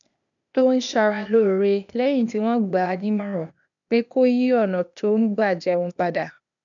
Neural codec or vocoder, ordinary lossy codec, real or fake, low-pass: codec, 16 kHz, 0.8 kbps, ZipCodec; none; fake; 7.2 kHz